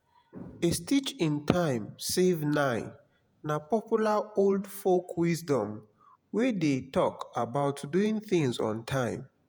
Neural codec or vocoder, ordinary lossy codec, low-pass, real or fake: vocoder, 48 kHz, 128 mel bands, Vocos; none; none; fake